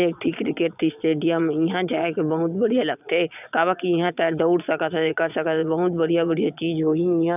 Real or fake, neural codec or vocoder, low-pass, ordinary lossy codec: fake; vocoder, 22.05 kHz, 80 mel bands, Vocos; 3.6 kHz; none